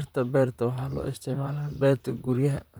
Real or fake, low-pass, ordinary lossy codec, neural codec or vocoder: fake; none; none; vocoder, 44.1 kHz, 128 mel bands, Pupu-Vocoder